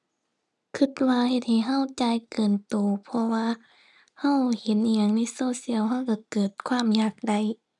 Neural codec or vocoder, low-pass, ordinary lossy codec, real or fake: none; 10.8 kHz; none; real